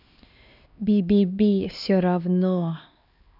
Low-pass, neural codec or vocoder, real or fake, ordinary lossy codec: 5.4 kHz; codec, 16 kHz, 2 kbps, X-Codec, HuBERT features, trained on LibriSpeech; fake; none